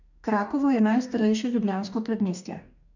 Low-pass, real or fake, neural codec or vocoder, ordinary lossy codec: 7.2 kHz; fake; codec, 44.1 kHz, 2.6 kbps, DAC; none